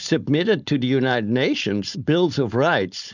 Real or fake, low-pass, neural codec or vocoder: fake; 7.2 kHz; codec, 16 kHz, 4.8 kbps, FACodec